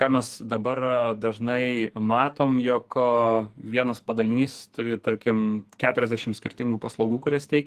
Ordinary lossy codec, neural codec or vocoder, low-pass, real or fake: Opus, 24 kbps; codec, 44.1 kHz, 2.6 kbps, SNAC; 14.4 kHz; fake